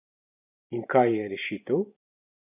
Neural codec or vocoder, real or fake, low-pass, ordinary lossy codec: vocoder, 44.1 kHz, 128 mel bands every 512 samples, BigVGAN v2; fake; 3.6 kHz; none